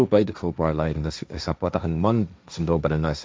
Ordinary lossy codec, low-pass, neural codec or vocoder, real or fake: none; none; codec, 16 kHz, 1.1 kbps, Voila-Tokenizer; fake